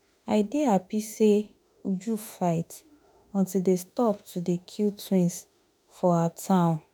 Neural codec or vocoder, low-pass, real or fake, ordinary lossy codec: autoencoder, 48 kHz, 32 numbers a frame, DAC-VAE, trained on Japanese speech; none; fake; none